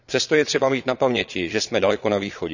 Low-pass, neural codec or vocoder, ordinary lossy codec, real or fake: 7.2 kHz; vocoder, 22.05 kHz, 80 mel bands, Vocos; none; fake